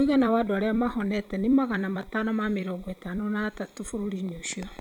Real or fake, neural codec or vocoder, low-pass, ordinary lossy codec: fake; vocoder, 44.1 kHz, 128 mel bands every 256 samples, BigVGAN v2; 19.8 kHz; none